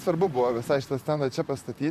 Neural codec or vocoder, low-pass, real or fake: vocoder, 44.1 kHz, 128 mel bands, Pupu-Vocoder; 14.4 kHz; fake